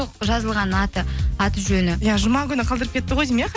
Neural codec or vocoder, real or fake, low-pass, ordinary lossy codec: none; real; none; none